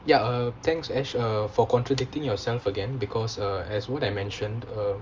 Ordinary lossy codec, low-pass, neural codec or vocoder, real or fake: Opus, 32 kbps; 7.2 kHz; none; real